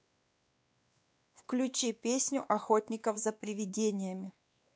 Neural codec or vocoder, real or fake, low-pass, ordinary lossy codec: codec, 16 kHz, 2 kbps, X-Codec, WavLM features, trained on Multilingual LibriSpeech; fake; none; none